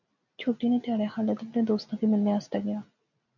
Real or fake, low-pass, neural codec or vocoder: real; 7.2 kHz; none